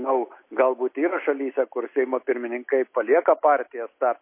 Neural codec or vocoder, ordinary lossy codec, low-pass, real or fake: none; MP3, 24 kbps; 3.6 kHz; real